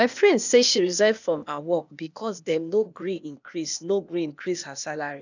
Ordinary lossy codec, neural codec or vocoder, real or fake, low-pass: none; codec, 16 kHz, 0.8 kbps, ZipCodec; fake; 7.2 kHz